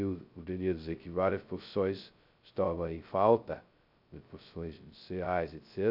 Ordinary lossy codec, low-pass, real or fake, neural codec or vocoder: none; 5.4 kHz; fake; codec, 16 kHz, 0.2 kbps, FocalCodec